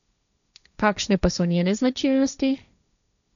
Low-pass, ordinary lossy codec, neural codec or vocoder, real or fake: 7.2 kHz; none; codec, 16 kHz, 1.1 kbps, Voila-Tokenizer; fake